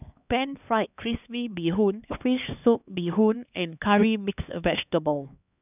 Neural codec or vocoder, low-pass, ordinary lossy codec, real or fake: codec, 16 kHz, 2 kbps, X-Codec, HuBERT features, trained on LibriSpeech; 3.6 kHz; none; fake